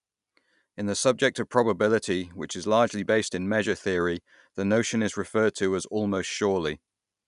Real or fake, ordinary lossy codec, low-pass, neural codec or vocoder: real; none; 10.8 kHz; none